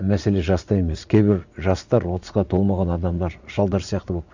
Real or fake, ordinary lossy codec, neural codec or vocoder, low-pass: fake; Opus, 64 kbps; vocoder, 44.1 kHz, 80 mel bands, Vocos; 7.2 kHz